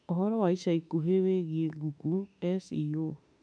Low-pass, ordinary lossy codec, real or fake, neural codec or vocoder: 9.9 kHz; none; fake; autoencoder, 48 kHz, 32 numbers a frame, DAC-VAE, trained on Japanese speech